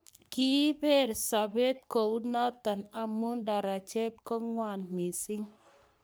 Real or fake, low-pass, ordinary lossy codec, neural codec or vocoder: fake; none; none; codec, 44.1 kHz, 3.4 kbps, Pupu-Codec